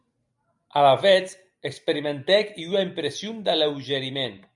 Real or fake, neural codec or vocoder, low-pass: real; none; 10.8 kHz